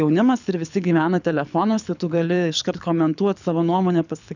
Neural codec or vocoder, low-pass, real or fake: codec, 24 kHz, 6 kbps, HILCodec; 7.2 kHz; fake